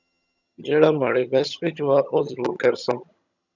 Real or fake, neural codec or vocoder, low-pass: fake; vocoder, 22.05 kHz, 80 mel bands, HiFi-GAN; 7.2 kHz